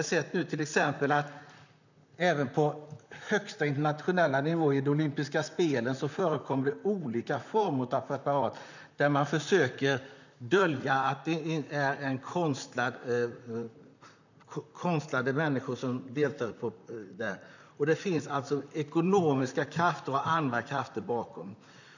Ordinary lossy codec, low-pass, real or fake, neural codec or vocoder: none; 7.2 kHz; fake; vocoder, 44.1 kHz, 128 mel bands, Pupu-Vocoder